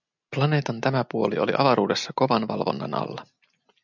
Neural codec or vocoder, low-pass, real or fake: none; 7.2 kHz; real